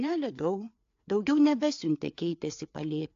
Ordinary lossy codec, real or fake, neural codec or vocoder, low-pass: AAC, 48 kbps; fake; codec, 16 kHz, 4 kbps, FreqCodec, larger model; 7.2 kHz